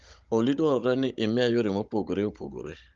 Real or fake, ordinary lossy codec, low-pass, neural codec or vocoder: fake; Opus, 32 kbps; 7.2 kHz; codec, 16 kHz, 16 kbps, FunCodec, trained on Chinese and English, 50 frames a second